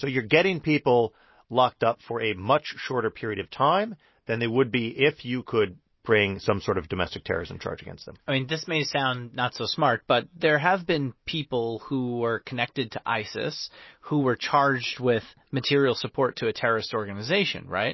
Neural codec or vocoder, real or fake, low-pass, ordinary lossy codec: none; real; 7.2 kHz; MP3, 24 kbps